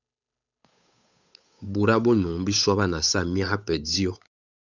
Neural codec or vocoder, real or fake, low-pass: codec, 16 kHz, 8 kbps, FunCodec, trained on Chinese and English, 25 frames a second; fake; 7.2 kHz